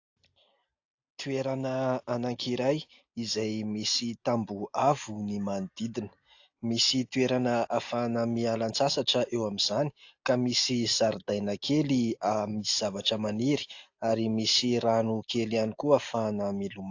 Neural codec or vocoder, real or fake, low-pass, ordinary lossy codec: none; real; 7.2 kHz; AAC, 48 kbps